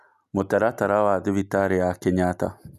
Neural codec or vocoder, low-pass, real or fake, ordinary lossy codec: none; 14.4 kHz; real; none